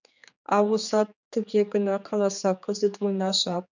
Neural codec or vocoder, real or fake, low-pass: codec, 16 kHz, 4 kbps, X-Codec, HuBERT features, trained on general audio; fake; 7.2 kHz